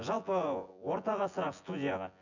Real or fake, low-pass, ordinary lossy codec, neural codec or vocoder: fake; 7.2 kHz; none; vocoder, 24 kHz, 100 mel bands, Vocos